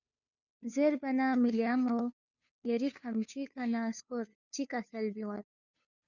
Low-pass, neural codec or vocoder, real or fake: 7.2 kHz; codec, 16 kHz, 2 kbps, FunCodec, trained on Chinese and English, 25 frames a second; fake